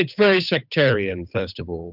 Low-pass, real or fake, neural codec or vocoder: 5.4 kHz; fake; codec, 16 kHz, 8 kbps, FunCodec, trained on Chinese and English, 25 frames a second